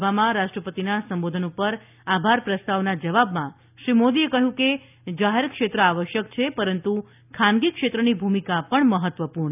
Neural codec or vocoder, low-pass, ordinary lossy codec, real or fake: none; 3.6 kHz; none; real